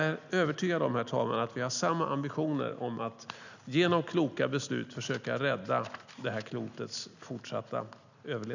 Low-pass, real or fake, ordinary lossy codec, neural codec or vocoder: 7.2 kHz; real; none; none